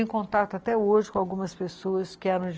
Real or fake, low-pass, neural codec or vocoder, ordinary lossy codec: real; none; none; none